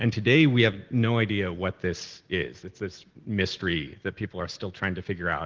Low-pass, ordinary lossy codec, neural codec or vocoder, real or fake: 7.2 kHz; Opus, 16 kbps; none; real